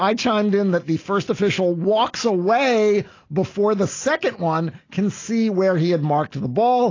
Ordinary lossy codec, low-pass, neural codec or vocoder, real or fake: AAC, 32 kbps; 7.2 kHz; none; real